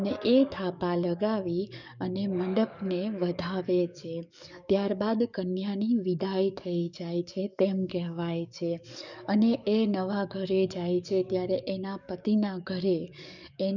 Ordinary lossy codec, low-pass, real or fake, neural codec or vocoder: none; 7.2 kHz; fake; codec, 44.1 kHz, 7.8 kbps, DAC